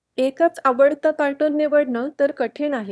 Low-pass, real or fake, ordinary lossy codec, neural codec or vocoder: none; fake; none; autoencoder, 22.05 kHz, a latent of 192 numbers a frame, VITS, trained on one speaker